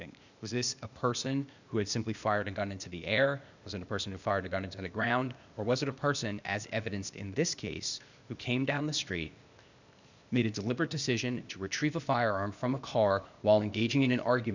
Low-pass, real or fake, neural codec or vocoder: 7.2 kHz; fake; codec, 16 kHz, 0.8 kbps, ZipCodec